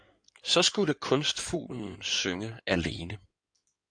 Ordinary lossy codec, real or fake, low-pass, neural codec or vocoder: AAC, 64 kbps; fake; 9.9 kHz; codec, 16 kHz in and 24 kHz out, 2.2 kbps, FireRedTTS-2 codec